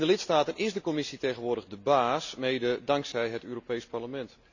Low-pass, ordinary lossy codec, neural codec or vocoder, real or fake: 7.2 kHz; none; none; real